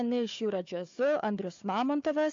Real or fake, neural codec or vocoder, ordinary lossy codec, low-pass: fake; codec, 16 kHz, 4 kbps, FunCodec, trained on Chinese and English, 50 frames a second; AAC, 48 kbps; 7.2 kHz